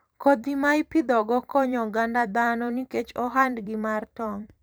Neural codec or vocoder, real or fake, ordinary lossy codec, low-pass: vocoder, 44.1 kHz, 128 mel bands every 512 samples, BigVGAN v2; fake; none; none